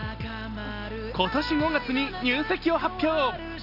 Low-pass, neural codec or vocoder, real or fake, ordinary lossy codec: 5.4 kHz; none; real; none